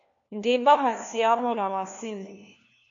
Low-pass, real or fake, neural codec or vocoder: 7.2 kHz; fake; codec, 16 kHz, 1 kbps, FunCodec, trained on LibriTTS, 50 frames a second